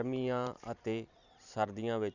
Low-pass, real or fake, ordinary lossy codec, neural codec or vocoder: 7.2 kHz; fake; none; vocoder, 44.1 kHz, 128 mel bands every 512 samples, BigVGAN v2